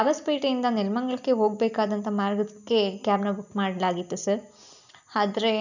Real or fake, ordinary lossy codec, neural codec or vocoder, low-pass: real; none; none; 7.2 kHz